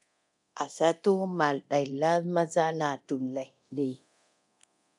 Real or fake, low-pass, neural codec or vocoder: fake; 10.8 kHz; codec, 24 kHz, 0.9 kbps, DualCodec